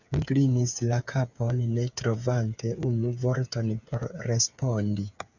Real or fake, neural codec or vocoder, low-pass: fake; codec, 16 kHz, 8 kbps, FreqCodec, smaller model; 7.2 kHz